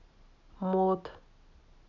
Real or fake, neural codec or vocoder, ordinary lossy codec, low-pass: real; none; none; 7.2 kHz